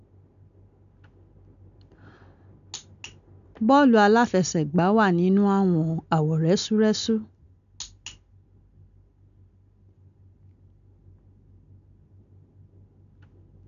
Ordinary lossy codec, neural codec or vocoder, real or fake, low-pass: none; none; real; 7.2 kHz